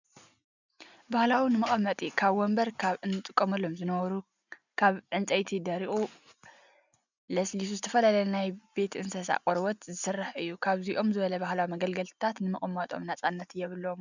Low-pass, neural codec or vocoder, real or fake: 7.2 kHz; none; real